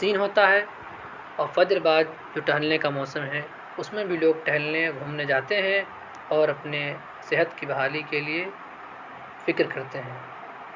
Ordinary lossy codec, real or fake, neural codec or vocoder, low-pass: Opus, 64 kbps; real; none; 7.2 kHz